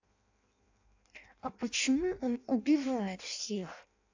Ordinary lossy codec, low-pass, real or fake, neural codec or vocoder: AAC, 48 kbps; 7.2 kHz; fake; codec, 16 kHz in and 24 kHz out, 0.6 kbps, FireRedTTS-2 codec